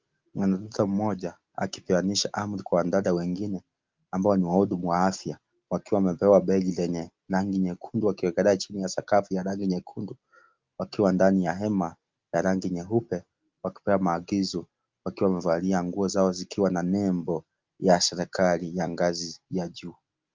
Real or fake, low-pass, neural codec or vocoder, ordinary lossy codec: real; 7.2 kHz; none; Opus, 32 kbps